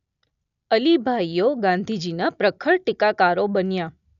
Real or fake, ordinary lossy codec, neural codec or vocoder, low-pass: real; none; none; 7.2 kHz